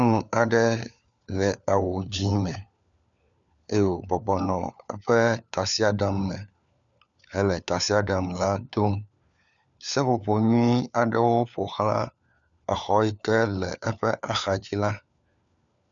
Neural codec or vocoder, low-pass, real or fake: codec, 16 kHz, 4 kbps, FunCodec, trained on LibriTTS, 50 frames a second; 7.2 kHz; fake